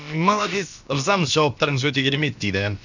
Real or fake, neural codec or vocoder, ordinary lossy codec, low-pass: fake; codec, 16 kHz, about 1 kbps, DyCAST, with the encoder's durations; none; 7.2 kHz